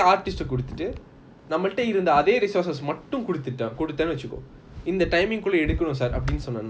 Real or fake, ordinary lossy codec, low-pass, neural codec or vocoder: real; none; none; none